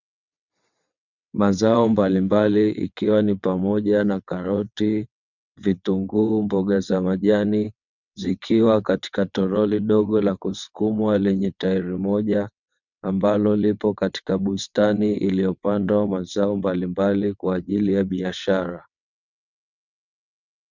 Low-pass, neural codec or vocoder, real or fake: 7.2 kHz; vocoder, 22.05 kHz, 80 mel bands, WaveNeXt; fake